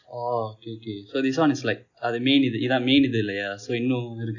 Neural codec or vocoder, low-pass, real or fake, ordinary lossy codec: none; 7.2 kHz; real; none